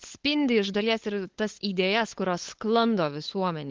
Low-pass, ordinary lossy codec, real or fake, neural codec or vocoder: 7.2 kHz; Opus, 16 kbps; fake; codec, 16 kHz, 8 kbps, FunCodec, trained on LibriTTS, 25 frames a second